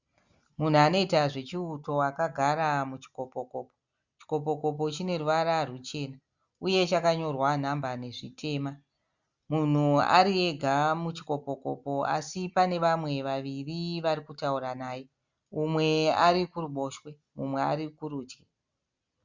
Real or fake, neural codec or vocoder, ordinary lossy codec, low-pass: real; none; Opus, 64 kbps; 7.2 kHz